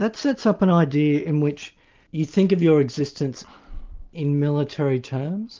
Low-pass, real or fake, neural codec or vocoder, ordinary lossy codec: 7.2 kHz; real; none; Opus, 32 kbps